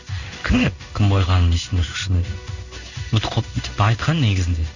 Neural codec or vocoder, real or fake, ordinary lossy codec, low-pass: codec, 16 kHz in and 24 kHz out, 1 kbps, XY-Tokenizer; fake; none; 7.2 kHz